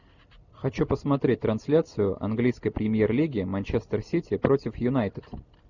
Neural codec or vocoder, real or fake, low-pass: none; real; 7.2 kHz